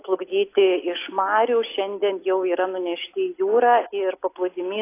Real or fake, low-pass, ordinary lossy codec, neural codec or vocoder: real; 3.6 kHz; AAC, 24 kbps; none